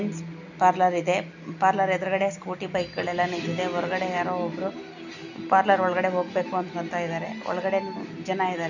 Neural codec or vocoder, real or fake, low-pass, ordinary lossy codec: none; real; 7.2 kHz; none